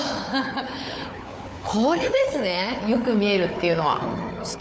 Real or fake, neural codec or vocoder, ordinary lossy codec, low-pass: fake; codec, 16 kHz, 4 kbps, FunCodec, trained on Chinese and English, 50 frames a second; none; none